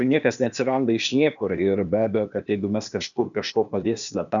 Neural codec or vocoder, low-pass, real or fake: codec, 16 kHz, 0.8 kbps, ZipCodec; 7.2 kHz; fake